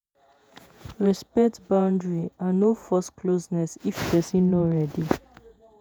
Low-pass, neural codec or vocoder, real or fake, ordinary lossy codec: none; vocoder, 48 kHz, 128 mel bands, Vocos; fake; none